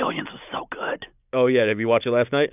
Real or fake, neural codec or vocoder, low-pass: real; none; 3.6 kHz